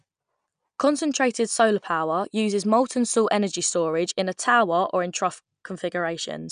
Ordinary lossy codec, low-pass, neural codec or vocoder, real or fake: none; 9.9 kHz; none; real